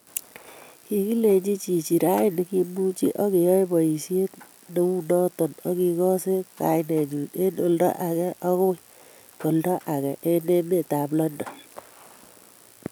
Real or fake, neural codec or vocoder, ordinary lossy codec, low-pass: real; none; none; none